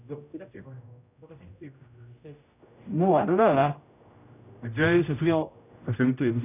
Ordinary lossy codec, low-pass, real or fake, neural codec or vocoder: none; 3.6 kHz; fake; codec, 16 kHz, 0.5 kbps, X-Codec, HuBERT features, trained on general audio